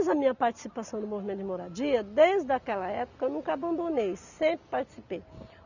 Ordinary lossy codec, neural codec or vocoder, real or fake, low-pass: none; none; real; 7.2 kHz